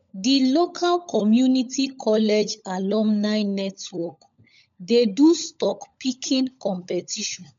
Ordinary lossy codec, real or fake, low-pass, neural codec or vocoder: MP3, 64 kbps; fake; 7.2 kHz; codec, 16 kHz, 16 kbps, FunCodec, trained on LibriTTS, 50 frames a second